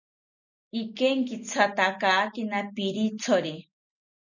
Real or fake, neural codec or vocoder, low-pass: real; none; 7.2 kHz